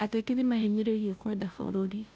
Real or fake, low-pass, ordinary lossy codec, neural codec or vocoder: fake; none; none; codec, 16 kHz, 0.5 kbps, FunCodec, trained on Chinese and English, 25 frames a second